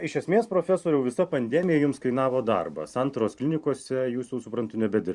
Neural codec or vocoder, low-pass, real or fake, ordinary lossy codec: vocoder, 44.1 kHz, 128 mel bands every 512 samples, BigVGAN v2; 10.8 kHz; fake; Opus, 64 kbps